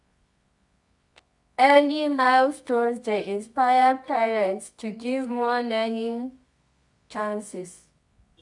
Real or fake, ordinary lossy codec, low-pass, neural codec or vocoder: fake; none; 10.8 kHz; codec, 24 kHz, 0.9 kbps, WavTokenizer, medium music audio release